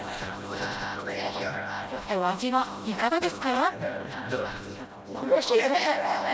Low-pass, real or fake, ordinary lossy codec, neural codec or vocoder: none; fake; none; codec, 16 kHz, 0.5 kbps, FreqCodec, smaller model